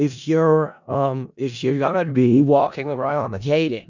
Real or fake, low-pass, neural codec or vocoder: fake; 7.2 kHz; codec, 16 kHz in and 24 kHz out, 0.4 kbps, LongCat-Audio-Codec, four codebook decoder